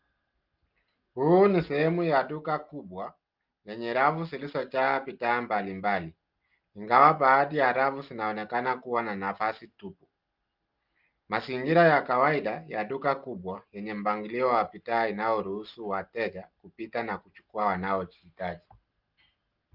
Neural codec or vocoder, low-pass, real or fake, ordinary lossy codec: none; 5.4 kHz; real; Opus, 24 kbps